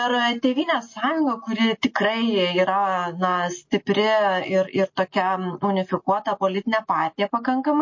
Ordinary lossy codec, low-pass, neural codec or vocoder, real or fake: MP3, 32 kbps; 7.2 kHz; none; real